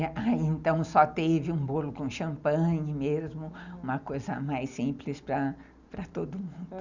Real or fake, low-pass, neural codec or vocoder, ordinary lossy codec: real; 7.2 kHz; none; Opus, 64 kbps